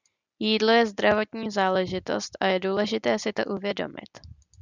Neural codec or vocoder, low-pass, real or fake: none; 7.2 kHz; real